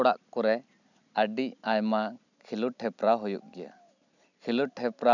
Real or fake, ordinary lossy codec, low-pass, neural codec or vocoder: real; none; 7.2 kHz; none